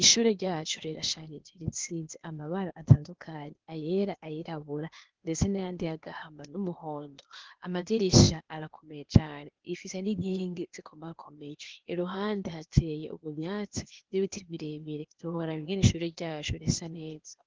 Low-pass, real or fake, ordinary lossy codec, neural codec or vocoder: 7.2 kHz; fake; Opus, 16 kbps; codec, 16 kHz, 0.8 kbps, ZipCodec